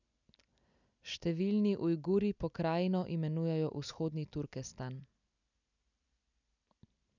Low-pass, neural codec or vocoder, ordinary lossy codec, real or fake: 7.2 kHz; none; none; real